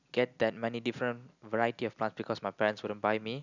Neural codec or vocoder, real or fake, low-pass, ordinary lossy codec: none; real; 7.2 kHz; none